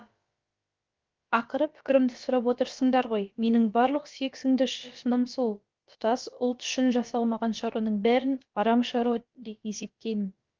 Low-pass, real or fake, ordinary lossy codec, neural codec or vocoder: 7.2 kHz; fake; Opus, 32 kbps; codec, 16 kHz, about 1 kbps, DyCAST, with the encoder's durations